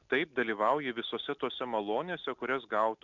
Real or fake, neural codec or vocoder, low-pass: real; none; 7.2 kHz